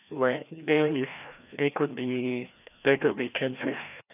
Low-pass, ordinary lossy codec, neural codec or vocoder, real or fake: 3.6 kHz; none; codec, 16 kHz, 1 kbps, FreqCodec, larger model; fake